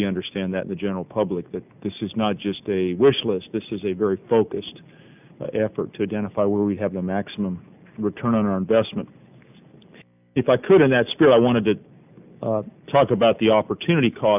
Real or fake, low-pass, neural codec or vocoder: real; 3.6 kHz; none